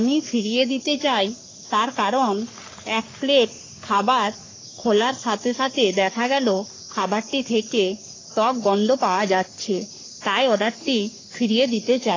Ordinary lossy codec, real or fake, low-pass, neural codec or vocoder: AAC, 32 kbps; fake; 7.2 kHz; codec, 44.1 kHz, 3.4 kbps, Pupu-Codec